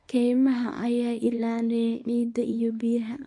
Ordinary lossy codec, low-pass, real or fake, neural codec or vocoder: MP3, 48 kbps; 10.8 kHz; fake; codec, 24 kHz, 0.9 kbps, WavTokenizer, small release